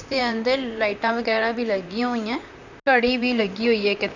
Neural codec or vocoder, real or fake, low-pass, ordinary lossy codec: vocoder, 44.1 kHz, 128 mel bands, Pupu-Vocoder; fake; 7.2 kHz; none